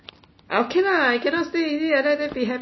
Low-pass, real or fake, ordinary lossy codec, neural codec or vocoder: 7.2 kHz; real; MP3, 24 kbps; none